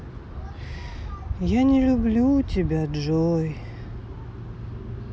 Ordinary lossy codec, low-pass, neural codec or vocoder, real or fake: none; none; none; real